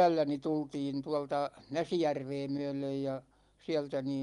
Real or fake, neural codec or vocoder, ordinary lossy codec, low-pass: real; none; Opus, 32 kbps; 10.8 kHz